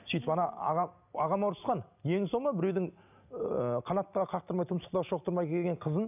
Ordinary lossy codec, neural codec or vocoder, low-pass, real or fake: none; none; 3.6 kHz; real